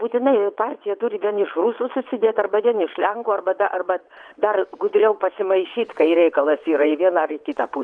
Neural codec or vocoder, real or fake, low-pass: vocoder, 22.05 kHz, 80 mel bands, WaveNeXt; fake; 9.9 kHz